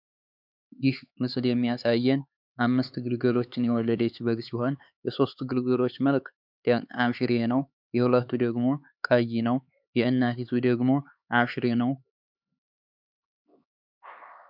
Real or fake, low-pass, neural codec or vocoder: fake; 5.4 kHz; codec, 16 kHz, 4 kbps, X-Codec, HuBERT features, trained on LibriSpeech